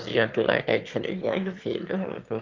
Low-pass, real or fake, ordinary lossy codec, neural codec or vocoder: 7.2 kHz; fake; Opus, 32 kbps; autoencoder, 22.05 kHz, a latent of 192 numbers a frame, VITS, trained on one speaker